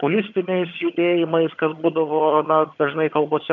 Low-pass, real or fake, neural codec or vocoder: 7.2 kHz; fake; vocoder, 22.05 kHz, 80 mel bands, HiFi-GAN